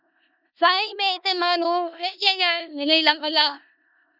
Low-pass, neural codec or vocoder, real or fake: 5.4 kHz; codec, 16 kHz in and 24 kHz out, 0.4 kbps, LongCat-Audio-Codec, four codebook decoder; fake